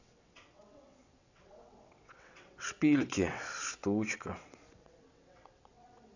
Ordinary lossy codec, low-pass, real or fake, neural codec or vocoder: none; 7.2 kHz; fake; vocoder, 22.05 kHz, 80 mel bands, WaveNeXt